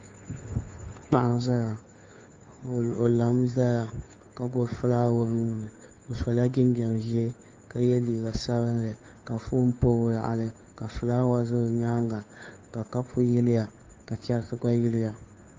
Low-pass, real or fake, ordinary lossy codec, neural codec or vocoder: 7.2 kHz; fake; Opus, 32 kbps; codec, 16 kHz, 2 kbps, FunCodec, trained on Chinese and English, 25 frames a second